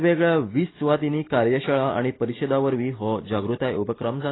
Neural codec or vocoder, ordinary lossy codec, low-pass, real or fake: none; AAC, 16 kbps; 7.2 kHz; real